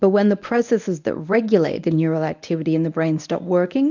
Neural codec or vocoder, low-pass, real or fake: codec, 24 kHz, 0.9 kbps, WavTokenizer, medium speech release version 1; 7.2 kHz; fake